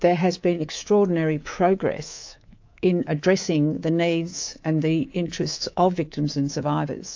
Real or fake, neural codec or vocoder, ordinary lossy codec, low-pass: fake; codec, 24 kHz, 3.1 kbps, DualCodec; AAC, 48 kbps; 7.2 kHz